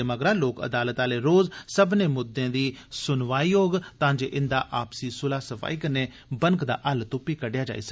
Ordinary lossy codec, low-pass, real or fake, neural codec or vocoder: none; none; real; none